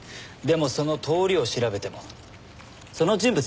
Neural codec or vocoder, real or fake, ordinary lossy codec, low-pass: none; real; none; none